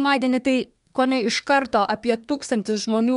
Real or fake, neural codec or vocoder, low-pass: fake; codec, 24 kHz, 1 kbps, SNAC; 10.8 kHz